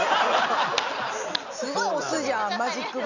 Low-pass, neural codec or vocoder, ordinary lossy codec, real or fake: 7.2 kHz; none; none; real